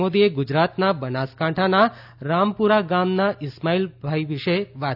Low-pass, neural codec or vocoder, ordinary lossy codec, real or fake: 5.4 kHz; none; none; real